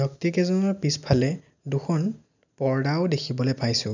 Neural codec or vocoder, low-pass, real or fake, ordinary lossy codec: none; 7.2 kHz; real; none